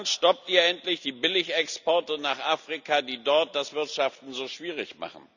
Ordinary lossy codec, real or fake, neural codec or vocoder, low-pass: none; real; none; 7.2 kHz